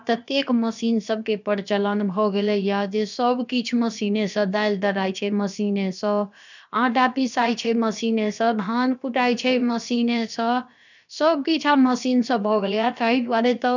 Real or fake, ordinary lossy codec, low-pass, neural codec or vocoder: fake; none; 7.2 kHz; codec, 16 kHz, about 1 kbps, DyCAST, with the encoder's durations